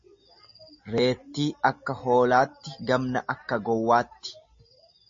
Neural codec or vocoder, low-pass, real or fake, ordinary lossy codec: none; 7.2 kHz; real; MP3, 32 kbps